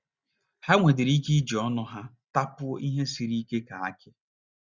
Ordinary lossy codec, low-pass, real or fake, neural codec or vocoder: Opus, 64 kbps; 7.2 kHz; real; none